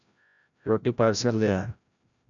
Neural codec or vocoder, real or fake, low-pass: codec, 16 kHz, 0.5 kbps, FreqCodec, larger model; fake; 7.2 kHz